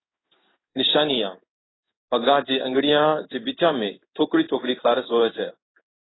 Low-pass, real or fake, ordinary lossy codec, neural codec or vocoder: 7.2 kHz; fake; AAC, 16 kbps; codec, 16 kHz in and 24 kHz out, 1 kbps, XY-Tokenizer